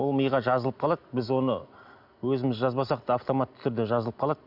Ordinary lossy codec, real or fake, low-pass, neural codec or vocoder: none; real; 5.4 kHz; none